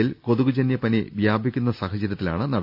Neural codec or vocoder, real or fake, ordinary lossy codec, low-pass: none; real; none; 5.4 kHz